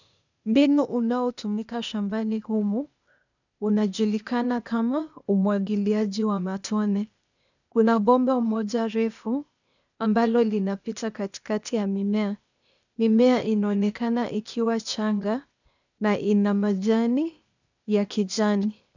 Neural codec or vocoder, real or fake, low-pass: codec, 16 kHz, 0.8 kbps, ZipCodec; fake; 7.2 kHz